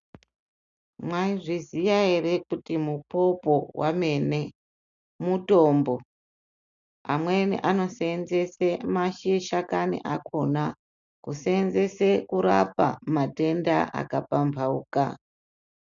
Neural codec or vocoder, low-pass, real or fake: none; 7.2 kHz; real